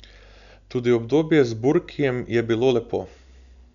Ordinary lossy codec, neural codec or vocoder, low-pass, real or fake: none; none; 7.2 kHz; real